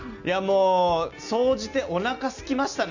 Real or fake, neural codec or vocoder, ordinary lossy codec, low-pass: real; none; none; 7.2 kHz